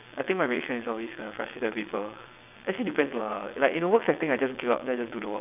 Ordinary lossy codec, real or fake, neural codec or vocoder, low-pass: none; fake; vocoder, 22.05 kHz, 80 mel bands, WaveNeXt; 3.6 kHz